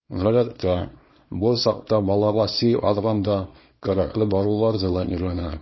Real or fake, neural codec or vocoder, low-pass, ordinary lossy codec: fake; codec, 24 kHz, 0.9 kbps, WavTokenizer, small release; 7.2 kHz; MP3, 24 kbps